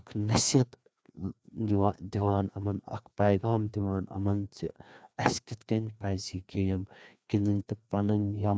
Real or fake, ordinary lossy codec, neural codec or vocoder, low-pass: fake; none; codec, 16 kHz, 2 kbps, FreqCodec, larger model; none